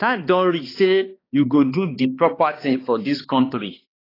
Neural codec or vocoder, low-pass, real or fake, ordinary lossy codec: codec, 16 kHz, 2 kbps, X-Codec, HuBERT features, trained on balanced general audio; 5.4 kHz; fake; AAC, 24 kbps